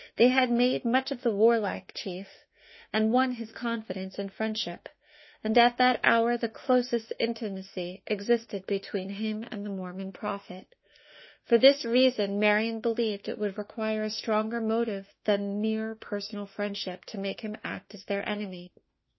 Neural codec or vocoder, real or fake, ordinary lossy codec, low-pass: autoencoder, 48 kHz, 32 numbers a frame, DAC-VAE, trained on Japanese speech; fake; MP3, 24 kbps; 7.2 kHz